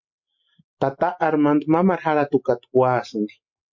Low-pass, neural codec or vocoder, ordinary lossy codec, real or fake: 7.2 kHz; none; MP3, 48 kbps; real